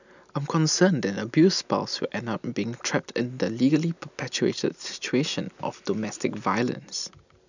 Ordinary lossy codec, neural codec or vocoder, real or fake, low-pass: none; none; real; 7.2 kHz